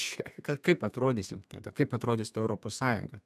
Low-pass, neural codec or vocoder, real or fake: 14.4 kHz; codec, 32 kHz, 1.9 kbps, SNAC; fake